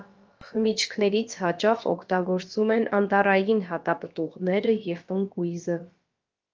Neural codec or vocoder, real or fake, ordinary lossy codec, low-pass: codec, 16 kHz, about 1 kbps, DyCAST, with the encoder's durations; fake; Opus, 24 kbps; 7.2 kHz